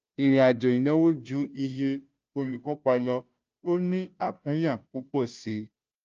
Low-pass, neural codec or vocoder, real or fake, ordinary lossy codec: 7.2 kHz; codec, 16 kHz, 0.5 kbps, FunCodec, trained on Chinese and English, 25 frames a second; fake; Opus, 24 kbps